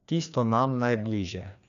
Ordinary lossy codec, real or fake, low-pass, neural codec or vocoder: none; fake; 7.2 kHz; codec, 16 kHz, 2 kbps, FreqCodec, larger model